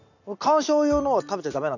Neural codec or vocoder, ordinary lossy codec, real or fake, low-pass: none; none; real; 7.2 kHz